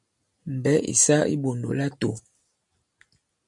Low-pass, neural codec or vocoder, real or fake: 10.8 kHz; none; real